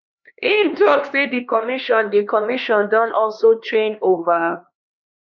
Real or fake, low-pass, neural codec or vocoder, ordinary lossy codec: fake; 7.2 kHz; codec, 16 kHz, 2 kbps, X-Codec, HuBERT features, trained on LibriSpeech; none